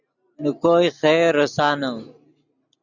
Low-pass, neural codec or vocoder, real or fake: 7.2 kHz; none; real